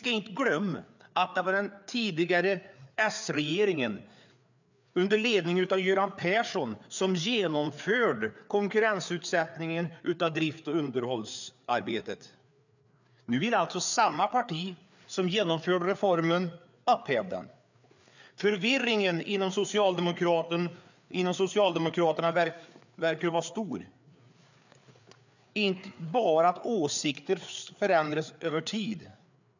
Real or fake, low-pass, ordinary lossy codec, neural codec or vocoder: fake; 7.2 kHz; none; codec, 16 kHz, 4 kbps, FreqCodec, larger model